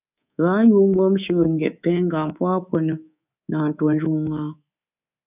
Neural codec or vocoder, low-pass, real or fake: codec, 24 kHz, 3.1 kbps, DualCodec; 3.6 kHz; fake